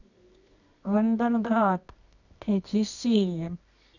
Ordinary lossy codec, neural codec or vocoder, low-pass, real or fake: none; codec, 24 kHz, 0.9 kbps, WavTokenizer, medium music audio release; 7.2 kHz; fake